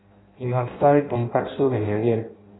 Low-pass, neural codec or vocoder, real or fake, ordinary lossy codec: 7.2 kHz; codec, 16 kHz in and 24 kHz out, 0.6 kbps, FireRedTTS-2 codec; fake; AAC, 16 kbps